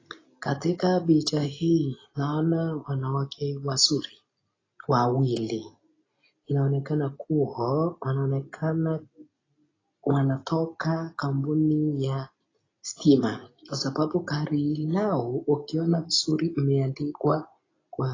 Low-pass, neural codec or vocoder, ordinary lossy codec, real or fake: 7.2 kHz; none; AAC, 32 kbps; real